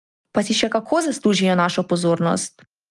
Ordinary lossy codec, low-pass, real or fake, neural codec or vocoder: Opus, 32 kbps; 10.8 kHz; real; none